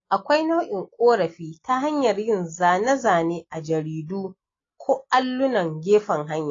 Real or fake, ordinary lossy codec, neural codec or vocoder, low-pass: real; AAC, 32 kbps; none; 7.2 kHz